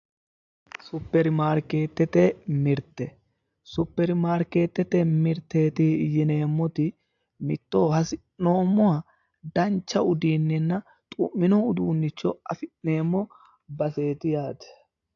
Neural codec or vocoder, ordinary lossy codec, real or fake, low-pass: none; AAC, 64 kbps; real; 7.2 kHz